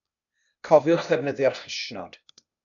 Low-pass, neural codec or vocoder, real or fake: 7.2 kHz; codec, 16 kHz, 0.8 kbps, ZipCodec; fake